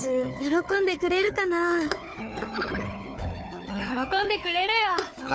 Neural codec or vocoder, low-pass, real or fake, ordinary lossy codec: codec, 16 kHz, 4 kbps, FunCodec, trained on Chinese and English, 50 frames a second; none; fake; none